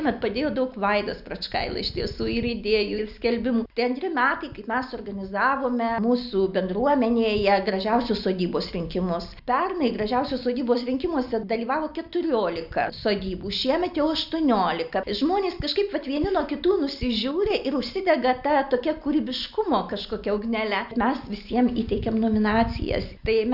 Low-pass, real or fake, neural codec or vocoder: 5.4 kHz; real; none